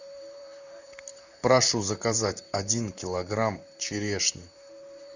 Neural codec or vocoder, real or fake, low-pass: vocoder, 44.1 kHz, 128 mel bands, Pupu-Vocoder; fake; 7.2 kHz